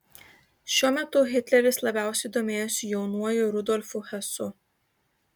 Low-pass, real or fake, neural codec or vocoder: 19.8 kHz; real; none